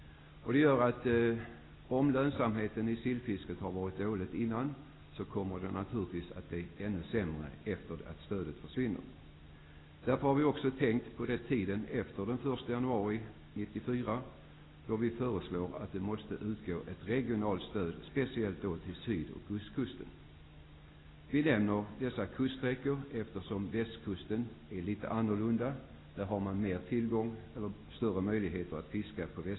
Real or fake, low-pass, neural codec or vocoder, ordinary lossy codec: real; 7.2 kHz; none; AAC, 16 kbps